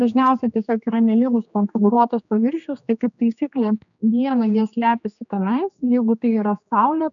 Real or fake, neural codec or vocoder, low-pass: fake; codec, 16 kHz, 2 kbps, X-Codec, HuBERT features, trained on general audio; 7.2 kHz